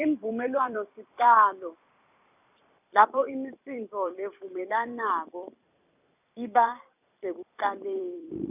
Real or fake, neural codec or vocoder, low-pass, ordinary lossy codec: fake; vocoder, 44.1 kHz, 128 mel bands every 256 samples, BigVGAN v2; 3.6 kHz; none